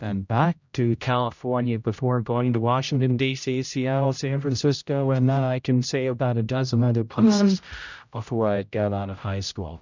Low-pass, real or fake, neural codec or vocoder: 7.2 kHz; fake; codec, 16 kHz, 0.5 kbps, X-Codec, HuBERT features, trained on general audio